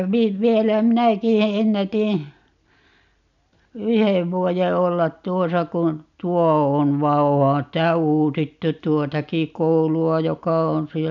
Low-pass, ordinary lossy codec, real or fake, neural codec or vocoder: 7.2 kHz; none; real; none